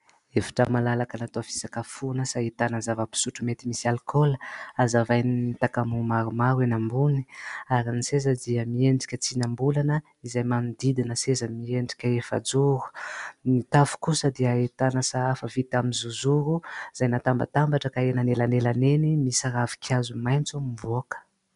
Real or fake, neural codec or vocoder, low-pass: real; none; 10.8 kHz